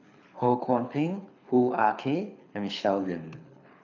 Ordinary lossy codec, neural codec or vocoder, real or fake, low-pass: none; codec, 24 kHz, 6 kbps, HILCodec; fake; 7.2 kHz